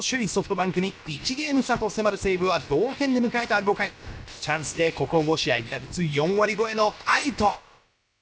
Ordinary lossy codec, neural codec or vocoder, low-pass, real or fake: none; codec, 16 kHz, about 1 kbps, DyCAST, with the encoder's durations; none; fake